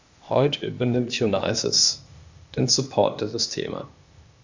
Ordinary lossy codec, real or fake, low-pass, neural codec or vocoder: Opus, 64 kbps; fake; 7.2 kHz; codec, 16 kHz, 0.8 kbps, ZipCodec